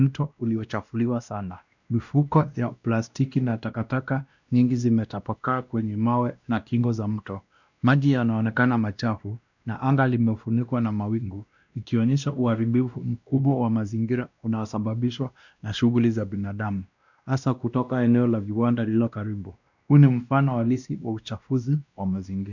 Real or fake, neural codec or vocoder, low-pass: fake; codec, 16 kHz, 1 kbps, X-Codec, WavLM features, trained on Multilingual LibriSpeech; 7.2 kHz